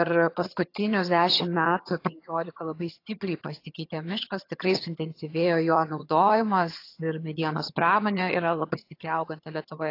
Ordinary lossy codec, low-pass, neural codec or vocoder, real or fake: AAC, 32 kbps; 5.4 kHz; vocoder, 22.05 kHz, 80 mel bands, HiFi-GAN; fake